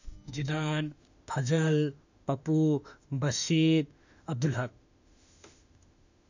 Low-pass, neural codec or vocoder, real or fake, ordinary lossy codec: 7.2 kHz; autoencoder, 48 kHz, 32 numbers a frame, DAC-VAE, trained on Japanese speech; fake; none